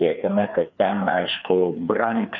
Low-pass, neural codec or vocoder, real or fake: 7.2 kHz; codec, 16 kHz, 2 kbps, FreqCodec, larger model; fake